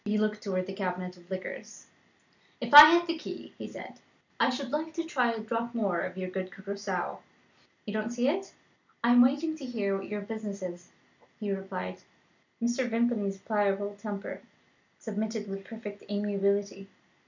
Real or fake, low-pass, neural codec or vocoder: real; 7.2 kHz; none